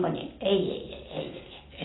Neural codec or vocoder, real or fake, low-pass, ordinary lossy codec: none; real; 7.2 kHz; AAC, 16 kbps